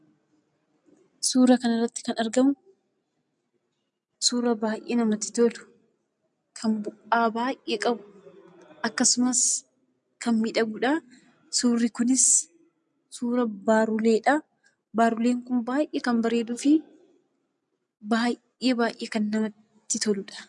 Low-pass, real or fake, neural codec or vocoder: 10.8 kHz; real; none